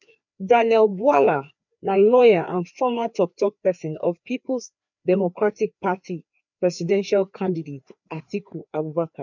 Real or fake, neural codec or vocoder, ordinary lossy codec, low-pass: fake; codec, 16 kHz, 2 kbps, FreqCodec, larger model; none; 7.2 kHz